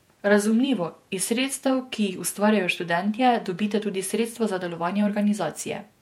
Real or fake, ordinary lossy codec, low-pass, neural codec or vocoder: fake; MP3, 64 kbps; 19.8 kHz; vocoder, 48 kHz, 128 mel bands, Vocos